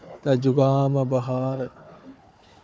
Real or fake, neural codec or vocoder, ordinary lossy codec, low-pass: fake; codec, 16 kHz, 4 kbps, FunCodec, trained on Chinese and English, 50 frames a second; none; none